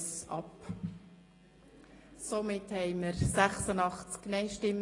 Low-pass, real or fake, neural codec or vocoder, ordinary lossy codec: 10.8 kHz; real; none; AAC, 32 kbps